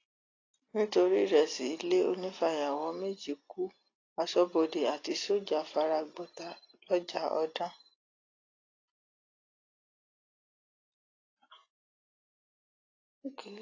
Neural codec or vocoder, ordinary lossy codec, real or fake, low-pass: none; AAC, 32 kbps; real; 7.2 kHz